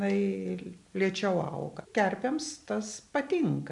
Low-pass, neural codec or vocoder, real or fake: 10.8 kHz; none; real